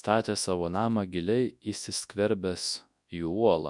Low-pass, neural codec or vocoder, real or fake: 10.8 kHz; codec, 24 kHz, 0.9 kbps, WavTokenizer, large speech release; fake